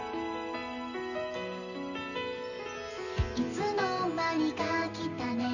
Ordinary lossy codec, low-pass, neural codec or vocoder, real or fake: none; 7.2 kHz; none; real